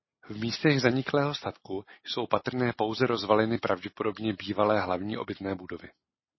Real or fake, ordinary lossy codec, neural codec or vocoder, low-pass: real; MP3, 24 kbps; none; 7.2 kHz